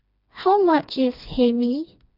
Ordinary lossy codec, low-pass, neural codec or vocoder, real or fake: none; 5.4 kHz; codec, 16 kHz, 2 kbps, FreqCodec, smaller model; fake